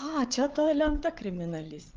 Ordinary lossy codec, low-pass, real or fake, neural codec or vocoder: Opus, 24 kbps; 7.2 kHz; fake; codec, 16 kHz, 8 kbps, FreqCodec, larger model